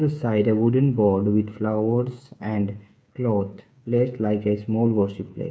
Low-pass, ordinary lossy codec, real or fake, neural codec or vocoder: none; none; fake; codec, 16 kHz, 8 kbps, FreqCodec, smaller model